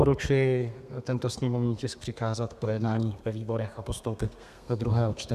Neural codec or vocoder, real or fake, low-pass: codec, 32 kHz, 1.9 kbps, SNAC; fake; 14.4 kHz